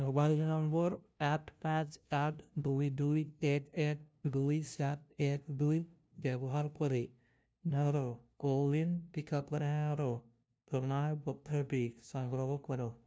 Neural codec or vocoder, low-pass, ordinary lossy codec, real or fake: codec, 16 kHz, 0.5 kbps, FunCodec, trained on LibriTTS, 25 frames a second; none; none; fake